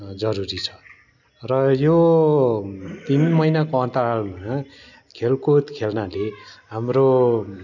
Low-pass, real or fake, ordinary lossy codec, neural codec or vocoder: 7.2 kHz; real; none; none